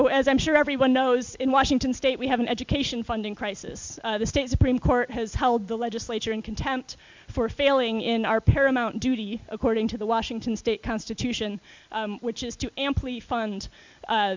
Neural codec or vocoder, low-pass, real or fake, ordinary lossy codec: none; 7.2 kHz; real; MP3, 64 kbps